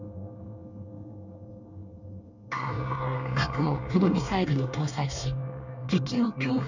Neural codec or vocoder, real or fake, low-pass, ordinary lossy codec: codec, 24 kHz, 1 kbps, SNAC; fake; 7.2 kHz; none